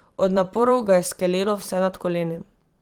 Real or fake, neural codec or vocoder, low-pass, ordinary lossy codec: fake; vocoder, 44.1 kHz, 128 mel bands every 512 samples, BigVGAN v2; 19.8 kHz; Opus, 24 kbps